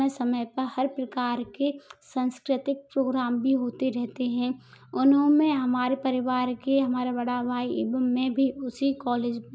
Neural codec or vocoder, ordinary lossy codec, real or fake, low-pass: none; none; real; none